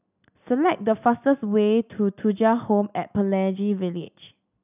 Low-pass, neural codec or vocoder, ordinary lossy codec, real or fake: 3.6 kHz; none; none; real